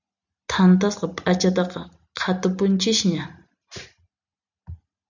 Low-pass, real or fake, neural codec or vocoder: 7.2 kHz; real; none